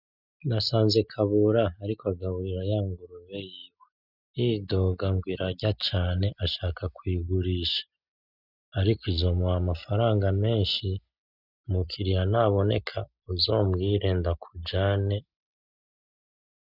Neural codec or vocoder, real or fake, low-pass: none; real; 5.4 kHz